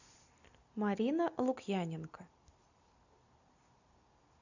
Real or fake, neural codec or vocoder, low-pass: real; none; 7.2 kHz